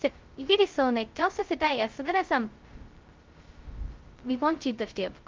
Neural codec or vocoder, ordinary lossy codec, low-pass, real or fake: codec, 16 kHz, 0.2 kbps, FocalCodec; Opus, 32 kbps; 7.2 kHz; fake